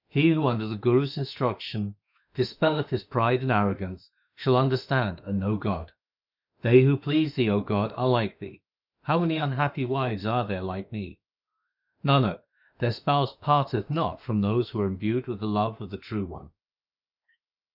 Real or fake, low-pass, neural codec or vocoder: fake; 5.4 kHz; autoencoder, 48 kHz, 32 numbers a frame, DAC-VAE, trained on Japanese speech